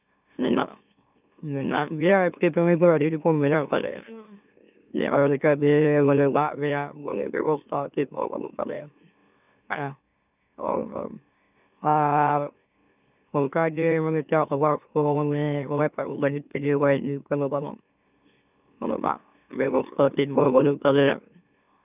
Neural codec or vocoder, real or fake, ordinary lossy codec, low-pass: autoencoder, 44.1 kHz, a latent of 192 numbers a frame, MeloTTS; fake; none; 3.6 kHz